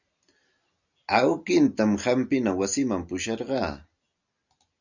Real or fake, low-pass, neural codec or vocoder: real; 7.2 kHz; none